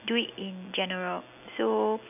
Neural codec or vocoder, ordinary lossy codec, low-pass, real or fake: none; none; 3.6 kHz; real